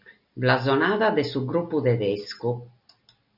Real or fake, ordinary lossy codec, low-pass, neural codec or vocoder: real; MP3, 48 kbps; 5.4 kHz; none